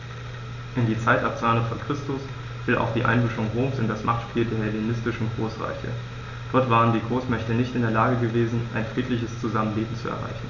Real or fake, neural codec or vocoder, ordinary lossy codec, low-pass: real; none; none; 7.2 kHz